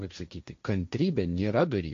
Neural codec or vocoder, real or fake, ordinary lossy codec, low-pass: codec, 16 kHz, 1.1 kbps, Voila-Tokenizer; fake; MP3, 48 kbps; 7.2 kHz